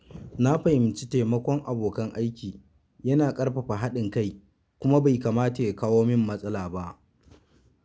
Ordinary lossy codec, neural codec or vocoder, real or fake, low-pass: none; none; real; none